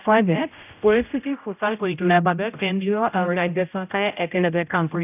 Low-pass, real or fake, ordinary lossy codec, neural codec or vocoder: 3.6 kHz; fake; none; codec, 16 kHz, 0.5 kbps, X-Codec, HuBERT features, trained on general audio